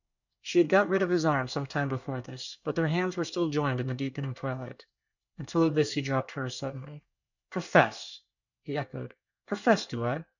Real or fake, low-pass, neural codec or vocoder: fake; 7.2 kHz; codec, 24 kHz, 1 kbps, SNAC